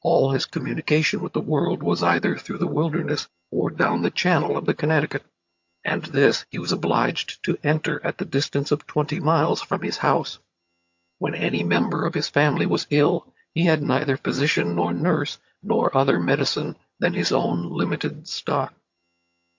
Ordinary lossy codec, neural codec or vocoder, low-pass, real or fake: MP3, 48 kbps; vocoder, 22.05 kHz, 80 mel bands, HiFi-GAN; 7.2 kHz; fake